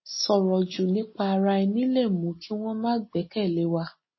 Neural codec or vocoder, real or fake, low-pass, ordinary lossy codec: none; real; 7.2 kHz; MP3, 24 kbps